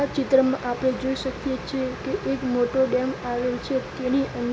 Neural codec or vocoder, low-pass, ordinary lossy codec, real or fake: none; none; none; real